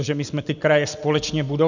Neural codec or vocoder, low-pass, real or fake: none; 7.2 kHz; real